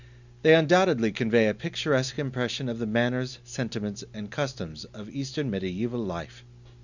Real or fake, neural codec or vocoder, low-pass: real; none; 7.2 kHz